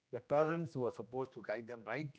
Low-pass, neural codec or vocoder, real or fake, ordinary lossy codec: none; codec, 16 kHz, 1 kbps, X-Codec, HuBERT features, trained on general audio; fake; none